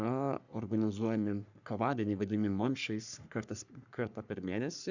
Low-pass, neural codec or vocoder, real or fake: 7.2 kHz; codec, 44.1 kHz, 3.4 kbps, Pupu-Codec; fake